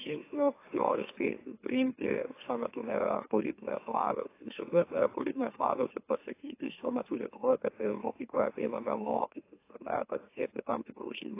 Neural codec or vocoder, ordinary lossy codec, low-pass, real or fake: autoencoder, 44.1 kHz, a latent of 192 numbers a frame, MeloTTS; AAC, 24 kbps; 3.6 kHz; fake